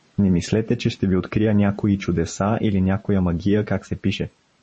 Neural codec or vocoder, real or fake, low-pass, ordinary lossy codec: vocoder, 44.1 kHz, 128 mel bands every 512 samples, BigVGAN v2; fake; 10.8 kHz; MP3, 32 kbps